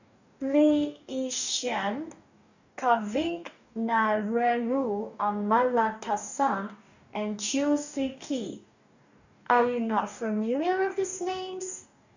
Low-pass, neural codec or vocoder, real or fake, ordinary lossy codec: 7.2 kHz; codec, 44.1 kHz, 2.6 kbps, DAC; fake; none